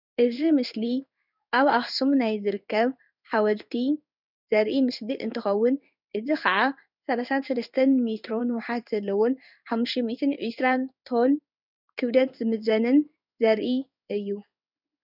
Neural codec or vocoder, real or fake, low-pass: codec, 16 kHz in and 24 kHz out, 1 kbps, XY-Tokenizer; fake; 5.4 kHz